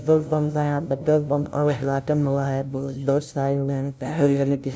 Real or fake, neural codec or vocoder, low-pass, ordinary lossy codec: fake; codec, 16 kHz, 0.5 kbps, FunCodec, trained on LibriTTS, 25 frames a second; none; none